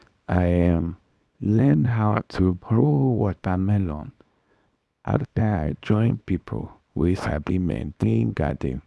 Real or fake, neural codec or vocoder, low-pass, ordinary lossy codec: fake; codec, 24 kHz, 0.9 kbps, WavTokenizer, small release; none; none